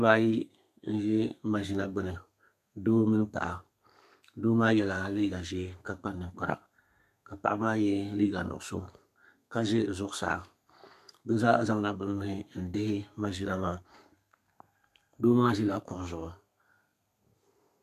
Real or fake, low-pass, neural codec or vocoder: fake; 14.4 kHz; codec, 32 kHz, 1.9 kbps, SNAC